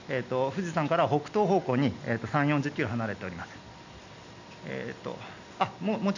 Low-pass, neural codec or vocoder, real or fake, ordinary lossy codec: 7.2 kHz; none; real; none